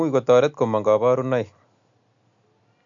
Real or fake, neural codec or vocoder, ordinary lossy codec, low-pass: real; none; AAC, 64 kbps; 7.2 kHz